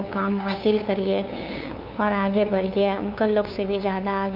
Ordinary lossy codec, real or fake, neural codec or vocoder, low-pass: none; fake; codec, 16 kHz, 2 kbps, FunCodec, trained on LibriTTS, 25 frames a second; 5.4 kHz